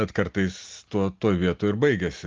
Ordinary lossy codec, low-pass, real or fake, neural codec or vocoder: Opus, 32 kbps; 7.2 kHz; real; none